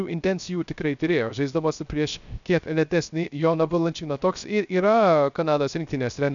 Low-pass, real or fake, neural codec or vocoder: 7.2 kHz; fake; codec, 16 kHz, 0.3 kbps, FocalCodec